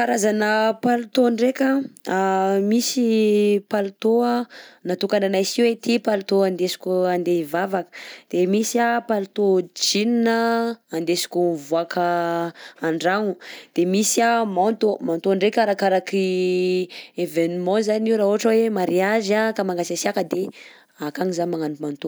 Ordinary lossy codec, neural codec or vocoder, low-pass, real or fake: none; none; none; real